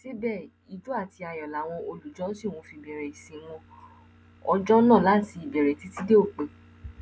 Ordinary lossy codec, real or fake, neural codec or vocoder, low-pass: none; real; none; none